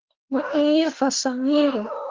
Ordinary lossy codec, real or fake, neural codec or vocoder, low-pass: Opus, 16 kbps; fake; autoencoder, 48 kHz, 32 numbers a frame, DAC-VAE, trained on Japanese speech; 7.2 kHz